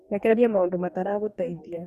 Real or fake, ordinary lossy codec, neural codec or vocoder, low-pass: fake; Opus, 64 kbps; codec, 44.1 kHz, 2.6 kbps, DAC; 14.4 kHz